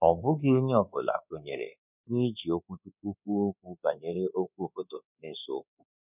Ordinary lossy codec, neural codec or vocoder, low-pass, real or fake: none; codec, 24 kHz, 1.2 kbps, DualCodec; 3.6 kHz; fake